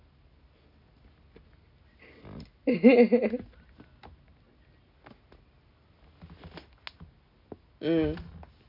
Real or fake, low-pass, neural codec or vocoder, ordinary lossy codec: real; 5.4 kHz; none; none